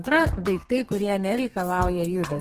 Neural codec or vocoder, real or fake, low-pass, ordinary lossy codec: codec, 32 kHz, 1.9 kbps, SNAC; fake; 14.4 kHz; Opus, 16 kbps